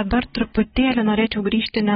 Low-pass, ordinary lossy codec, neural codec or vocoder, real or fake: 10.8 kHz; AAC, 16 kbps; none; real